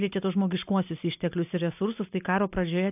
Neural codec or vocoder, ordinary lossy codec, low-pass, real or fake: none; AAC, 32 kbps; 3.6 kHz; real